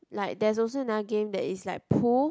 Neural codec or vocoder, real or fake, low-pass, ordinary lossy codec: none; real; none; none